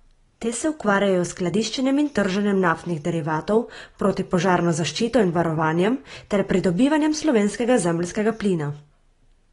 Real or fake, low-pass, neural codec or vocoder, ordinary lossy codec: real; 10.8 kHz; none; AAC, 32 kbps